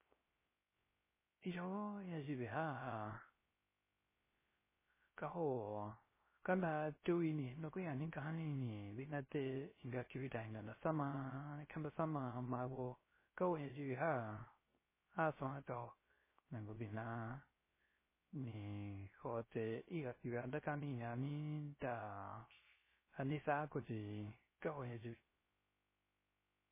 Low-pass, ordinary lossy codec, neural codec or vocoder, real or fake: 3.6 kHz; MP3, 16 kbps; codec, 16 kHz, 0.3 kbps, FocalCodec; fake